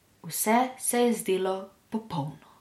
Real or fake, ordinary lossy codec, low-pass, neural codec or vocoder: real; MP3, 64 kbps; 19.8 kHz; none